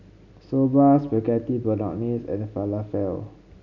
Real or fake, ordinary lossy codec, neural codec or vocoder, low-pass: real; none; none; 7.2 kHz